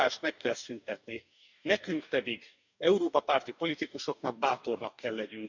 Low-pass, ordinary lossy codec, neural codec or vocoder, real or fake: 7.2 kHz; none; codec, 44.1 kHz, 2.6 kbps, DAC; fake